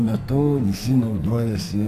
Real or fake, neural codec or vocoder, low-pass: fake; codec, 44.1 kHz, 2.6 kbps, SNAC; 14.4 kHz